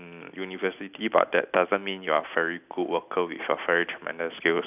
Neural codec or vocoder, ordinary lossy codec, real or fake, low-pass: none; none; real; 3.6 kHz